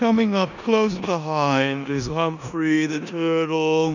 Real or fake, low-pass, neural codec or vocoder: fake; 7.2 kHz; codec, 16 kHz in and 24 kHz out, 0.9 kbps, LongCat-Audio-Codec, four codebook decoder